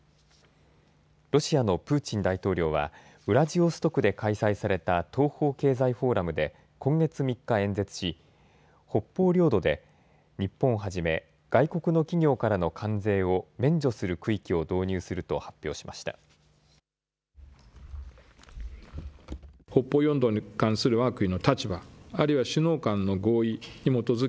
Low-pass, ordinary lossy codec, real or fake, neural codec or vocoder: none; none; real; none